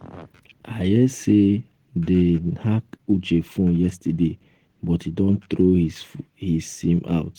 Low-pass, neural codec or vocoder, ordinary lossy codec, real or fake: 19.8 kHz; none; Opus, 16 kbps; real